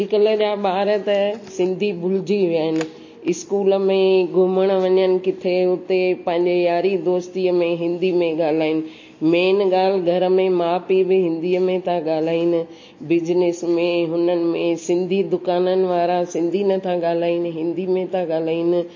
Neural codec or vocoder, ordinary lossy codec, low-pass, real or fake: none; MP3, 32 kbps; 7.2 kHz; real